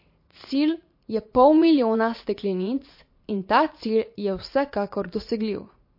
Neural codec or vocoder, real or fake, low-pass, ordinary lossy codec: vocoder, 44.1 kHz, 80 mel bands, Vocos; fake; 5.4 kHz; MP3, 32 kbps